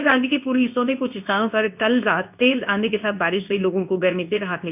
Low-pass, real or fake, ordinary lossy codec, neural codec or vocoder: 3.6 kHz; fake; none; codec, 24 kHz, 0.9 kbps, WavTokenizer, medium speech release version 2